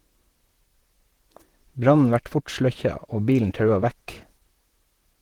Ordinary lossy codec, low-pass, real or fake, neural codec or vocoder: Opus, 16 kbps; 19.8 kHz; fake; vocoder, 44.1 kHz, 128 mel bands, Pupu-Vocoder